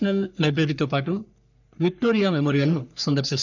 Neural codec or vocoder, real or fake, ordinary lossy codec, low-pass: codec, 44.1 kHz, 3.4 kbps, Pupu-Codec; fake; none; 7.2 kHz